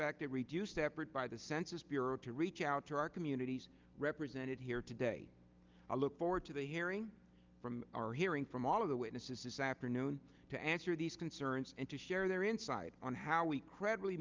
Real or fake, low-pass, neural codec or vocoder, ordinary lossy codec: real; 7.2 kHz; none; Opus, 32 kbps